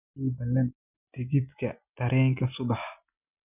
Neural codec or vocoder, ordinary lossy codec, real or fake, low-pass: none; none; real; 3.6 kHz